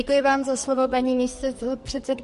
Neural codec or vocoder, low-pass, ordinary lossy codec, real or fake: codec, 44.1 kHz, 2.6 kbps, SNAC; 14.4 kHz; MP3, 48 kbps; fake